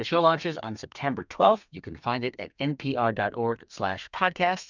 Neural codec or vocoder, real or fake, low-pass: codec, 32 kHz, 1.9 kbps, SNAC; fake; 7.2 kHz